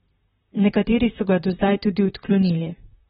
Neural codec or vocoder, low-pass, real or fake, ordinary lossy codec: vocoder, 44.1 kHz, 128 mel bands, Pupu-Vocoder; 19.8 kHz; fake; AAC, 16 kbps